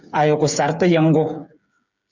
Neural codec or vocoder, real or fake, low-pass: codec, 16 kHz, 8 kbps, FreqCodec, smaller model; fake; 7.2 kHz